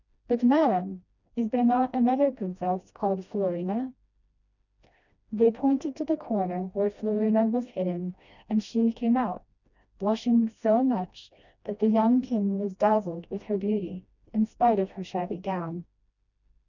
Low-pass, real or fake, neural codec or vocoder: 7.2 kHz; fake; codec, 16 kHz, 1 kbps, FreqCodec, smaller model